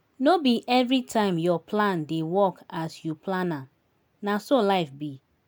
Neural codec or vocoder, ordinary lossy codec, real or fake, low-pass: none; none; real; none